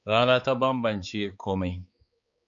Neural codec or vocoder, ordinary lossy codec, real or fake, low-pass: codec, 16 kHz, 4 kbps, X-Codec, HuBERT features, trained on balanced general audio; MP3, 48 kbps; fake; 7.2 kHz